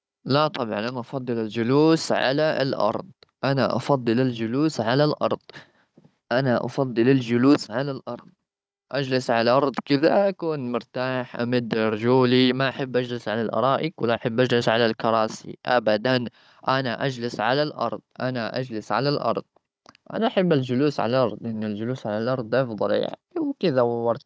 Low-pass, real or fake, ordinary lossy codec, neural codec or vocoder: none; fake; none; codec, 16 kHz, 16 kbps, FunCodec, trained on Chinese and English, 50 frames a second